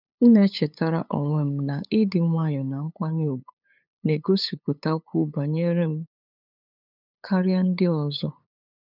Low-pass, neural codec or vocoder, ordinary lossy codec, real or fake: 5.4 kHz; codec, 16 kHz, 8 kbps, FunCodec, trained on LibriTTS, 25 frames a second; none; fake